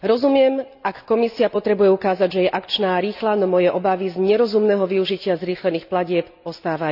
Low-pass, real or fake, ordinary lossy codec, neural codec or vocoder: 5.4 kHz; real; none; none